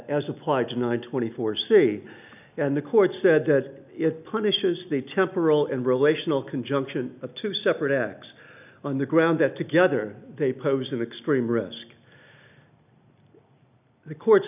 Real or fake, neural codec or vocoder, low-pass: real; none; 3.6 kHz